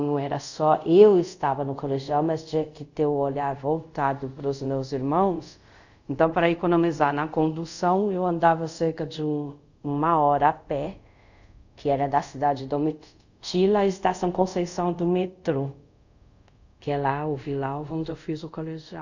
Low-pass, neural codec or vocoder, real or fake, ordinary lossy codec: 7.2 kHz; codec, 24 kHz, 0.5 kbps, DualCodec; fake; none